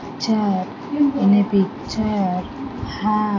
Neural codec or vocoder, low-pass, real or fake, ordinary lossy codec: none; 7.2 kHz; real; AAC, 32 kbps